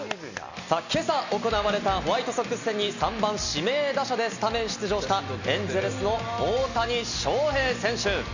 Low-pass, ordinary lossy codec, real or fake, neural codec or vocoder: 7.2 kHz; none; real; none